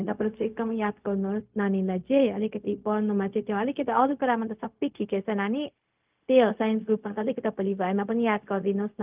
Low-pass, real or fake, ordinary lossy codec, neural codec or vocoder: 3.6 kHz; fake; Opus, 24 kbps; codec, 16 kHz, 0.4 kbps, LongCat-Audio-Codec